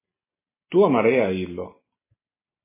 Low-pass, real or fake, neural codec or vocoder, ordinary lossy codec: 3.6 kHz; real; none; MP3, 16 kbps